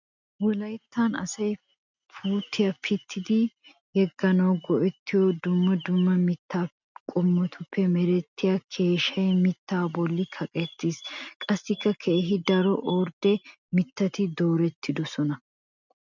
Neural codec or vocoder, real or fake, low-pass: none; real; 7.2 kHz